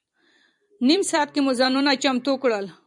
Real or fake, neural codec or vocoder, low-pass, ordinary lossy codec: fake; vocoder, 44.1 kHz, 128 mel bands every 256 samples, BigVGAN v2; 10.8 kHz; MP3, 96 kbps